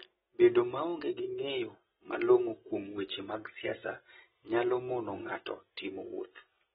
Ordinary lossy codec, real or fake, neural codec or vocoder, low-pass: AAC, 16 kbps; real; none; 7.2 kHz